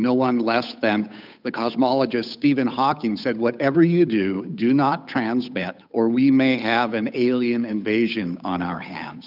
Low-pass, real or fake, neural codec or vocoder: 5.4 kHz; fake; codec, 16 kHz, 8 kbps, FunCodec, trained on Chinese and English, 25 frames a second